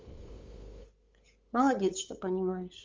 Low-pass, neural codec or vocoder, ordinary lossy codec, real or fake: 7.2 kHz; codec, 16 kHz, 8 kbps, FunCodec, trained on LibriTTS, 25 frames a second; Opus, 32 kbps; fake